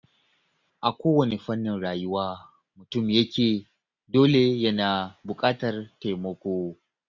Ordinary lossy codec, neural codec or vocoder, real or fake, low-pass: none; none; real; 7.2 kHz